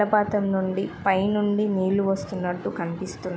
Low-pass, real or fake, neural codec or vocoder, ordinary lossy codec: none; real; none; none